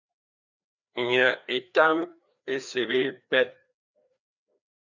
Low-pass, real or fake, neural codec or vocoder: 7.2 kHz; fake; codec, 16 kHz, 2 kbps, FreqCodec, larger model